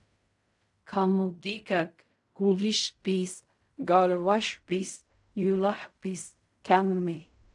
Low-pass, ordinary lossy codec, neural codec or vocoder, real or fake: 10.8 kHz; AAC, 64 kbps; codec, 16 kHz in and 24 kHz out, 0.4 kbps, LongCat-Audio-Codec, fine tuned four codebook decoder; fake